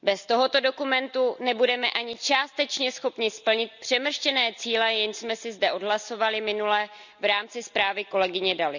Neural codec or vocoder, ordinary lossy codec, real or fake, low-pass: none; none; real; 7.2 kHz